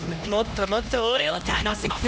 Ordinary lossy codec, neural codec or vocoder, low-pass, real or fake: none; codec, 16 kHz, 1 kbps, X-Codec, HuBERT features, trained on LibriSpeech; none; fake